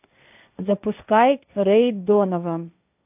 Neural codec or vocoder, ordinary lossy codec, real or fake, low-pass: codec, 16 kHz, 1.1 kbps, Voila-Tokenizer; AAC, 32 kbps; fake; 3.6 kHz